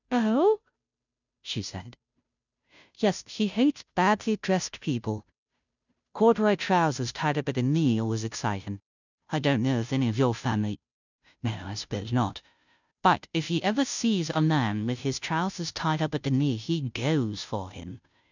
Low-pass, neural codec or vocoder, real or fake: 7.2 kHz; codec, 16 kHz, 0.5 kbps, FunCodec, trained on Chinese and English, 25 frames a second; fake